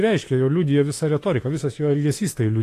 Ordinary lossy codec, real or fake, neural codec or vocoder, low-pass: AAC, 48 kbps; fake; autoencoder, 48 kHz, 32 numbers a frame, DAC-VAE, trained on Japanese speech; 14.4 kHz